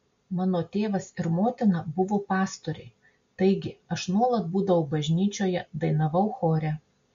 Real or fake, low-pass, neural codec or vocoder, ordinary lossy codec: real; 7.2 kHz; none; MP3, 48 kbps